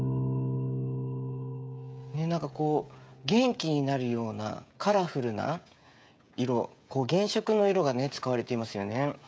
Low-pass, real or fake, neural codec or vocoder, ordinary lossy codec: none; fake; codec, 16 kHz, 16 kbps, FreqCodec, smaller model; none